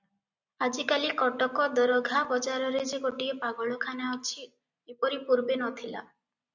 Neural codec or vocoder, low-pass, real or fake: none; 7.2 kHz; real